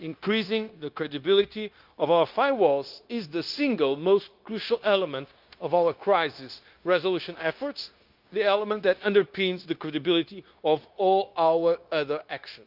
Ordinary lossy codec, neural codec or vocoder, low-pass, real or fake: Opus, 32 kbps; codec, 16 kHz, 0.9 kbps, LongCat-Audio-Codec; 5.4 kHz; fake